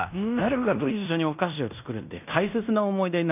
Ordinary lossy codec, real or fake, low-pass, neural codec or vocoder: none; fake; 3.6 kHz; codec, 16 kHz in and 24 kHz out, 0.9 kbps, LongCat-Audio-Codec, fine tuned four codebook decoder